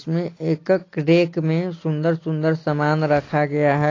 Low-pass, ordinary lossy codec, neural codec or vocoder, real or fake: 7.2 kHz; AAC, 32 kbps; none; real